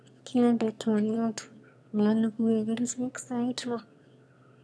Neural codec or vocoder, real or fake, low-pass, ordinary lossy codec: autoencoder, 22.05 kHz, a latent of 192 numbers a frame, VITS, trained on one speaker; fake; none; none